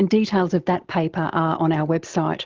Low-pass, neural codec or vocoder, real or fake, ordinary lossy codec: 7.2 kHz; none; real; Opus, 16 kbps